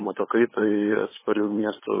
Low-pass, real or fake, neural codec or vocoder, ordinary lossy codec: 3.6 kHz; fake; codec, 16 kHz, 8 kbps, FunCodec, trained on LibriTTS, 25 frames a second; MP3, 16 kbps